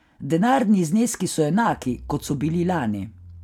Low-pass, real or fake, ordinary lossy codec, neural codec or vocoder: 19.8 kHz; real; none; none